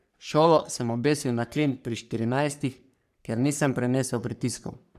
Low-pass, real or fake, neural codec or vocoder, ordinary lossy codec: 14.4 kHz; fake; codec, 44.1 kHz, 3.4 kbps, Pupu-Codec; none